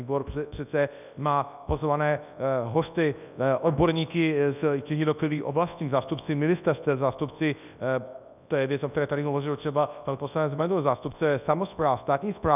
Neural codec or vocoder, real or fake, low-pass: codec, 24 kHz, 0.9 kbps, WavTokenizer, large speech release; fake; 3.6 kHz